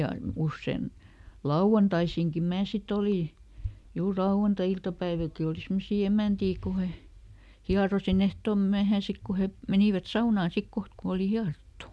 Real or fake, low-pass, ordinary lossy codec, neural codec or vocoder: real; none; none; none